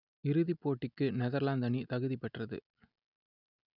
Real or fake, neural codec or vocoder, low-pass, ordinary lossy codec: real; none; 5.4 kHz; none